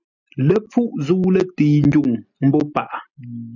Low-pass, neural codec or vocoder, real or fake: 7.2 kHz; none; real